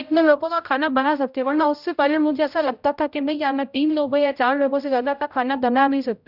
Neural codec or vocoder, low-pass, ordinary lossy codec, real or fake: codec, 16 kHz, 0.5 kbps, X-Codec, HuBERT features, trained on general audio; 5.4 kHz; none; fake